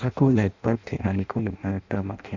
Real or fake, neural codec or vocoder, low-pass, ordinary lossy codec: fake; codec, 16 kHz in and 24 kHz out, 0.6 kbps, FireRedTTS-2 codec; 7.2 kHz; none